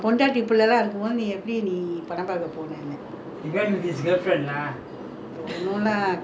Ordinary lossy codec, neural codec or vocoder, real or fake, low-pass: none; none; real; none